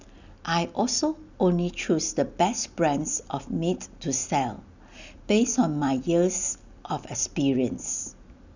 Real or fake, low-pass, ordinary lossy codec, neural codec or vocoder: real; 7.2 kHz; none; none